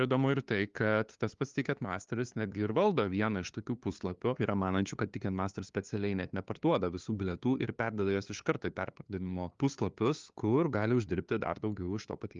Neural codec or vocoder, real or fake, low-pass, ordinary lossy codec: codec, 16 kHz, 2 kbps, FunCodec, trained on LibriTTS, 25 frames a second; fake; 7.2 kHz; Opus, 32 kbps